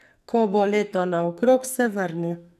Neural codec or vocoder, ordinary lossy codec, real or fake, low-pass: codec, 44.1 kHz, 2.6 kbps, SNAC; none; fake; 14.4 kHz